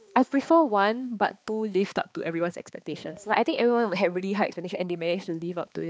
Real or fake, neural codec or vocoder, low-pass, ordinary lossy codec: fake; codec, 16 kHz, 2 kbps, X-Codec, HuBERT features, trained on balanced general audio; none; none